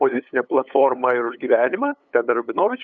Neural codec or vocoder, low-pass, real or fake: codec, 16 kHz, 8 kbps, FunCodec, trained on LibriTTS, 25 frames a second; 7.2 kHz; fake